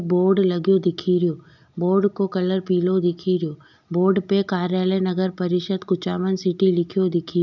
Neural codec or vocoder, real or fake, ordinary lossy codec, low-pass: none; real; none; 7.2 kHz